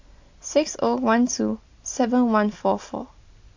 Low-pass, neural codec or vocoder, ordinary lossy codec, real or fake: 7.2 kHz; none; AAC, 48 kbps; real